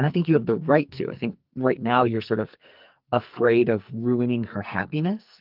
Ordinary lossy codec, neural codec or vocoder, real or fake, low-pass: Opus, 24 kbps; codec, 44.1 kHz, 2.6 kbps, SNAC; fake; 5.4 kHz